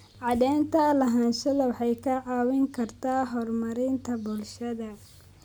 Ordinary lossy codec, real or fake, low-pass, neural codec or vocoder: none; real; none; none